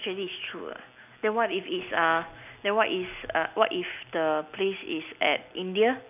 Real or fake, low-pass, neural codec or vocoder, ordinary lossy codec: real; 3.6 kHz; none; none